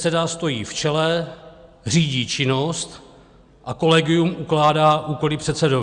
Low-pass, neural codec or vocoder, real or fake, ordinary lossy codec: 9.9 kHz; none; real; Opus, 64 kbps